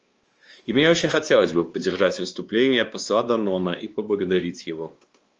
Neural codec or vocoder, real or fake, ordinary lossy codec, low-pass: codec, 16 kHz, 2 kbps, X-Codec, WavLM features, trained on Multilingual LibriSpeech; fake; Opus, 24 kbps; 7.2 kHz